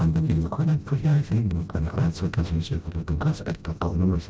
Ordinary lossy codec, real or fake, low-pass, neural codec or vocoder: none; fake; none; codec, 16 kHz, 0.5 kbps, FreqCodec, smaller model